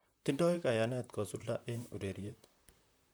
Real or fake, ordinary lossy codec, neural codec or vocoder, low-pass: fake; none; vocoder, 44.1 kHz, 128 mel bands, Pupu-Vocoder; none